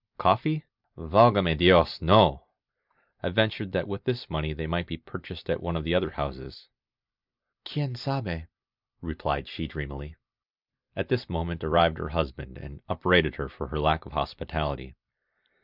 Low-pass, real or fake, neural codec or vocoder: 5.4 kHz; fake; vocoder, 44.1 kHz, 128 mel bands every 256 samples, BigVGAN v2